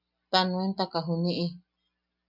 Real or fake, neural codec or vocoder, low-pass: real; none; 5.4 kHz